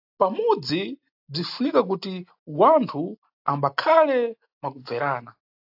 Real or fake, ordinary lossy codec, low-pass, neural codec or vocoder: real; AAC, 48 kbps; 5.4 kHz; none